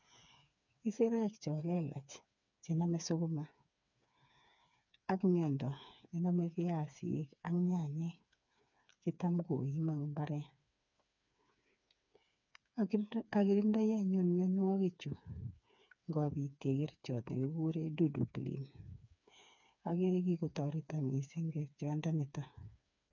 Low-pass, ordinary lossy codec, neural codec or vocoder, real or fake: 7.2 kHz; none; codec, 16 kHz, 4 kbps, FreqCodec, smaller model; fake